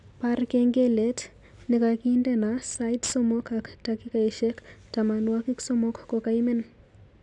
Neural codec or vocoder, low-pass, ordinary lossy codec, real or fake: none; 10.8 kHz; none; real